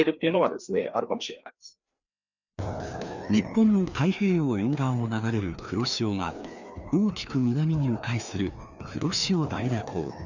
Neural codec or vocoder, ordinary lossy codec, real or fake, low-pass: codec, 16 kHz, 2 kbps, FreqCodec, larger model; none; fake; 7.2 kHz